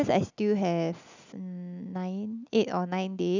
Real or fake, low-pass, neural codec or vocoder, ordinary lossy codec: real; 7.2 kHz; none; none